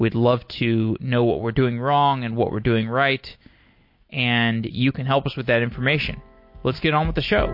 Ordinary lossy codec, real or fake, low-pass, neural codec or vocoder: MP3, 32 kbps; real; 5.4 kHz; none